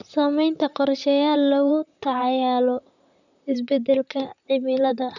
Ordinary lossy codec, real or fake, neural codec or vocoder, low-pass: none; fake; vocoder, 44.1 kHz, 128 mel bands, Pupu-Vocoder; 7.2 kHz